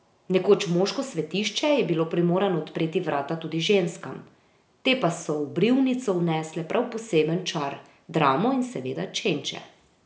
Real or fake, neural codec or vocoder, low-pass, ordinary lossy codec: real; none; none; none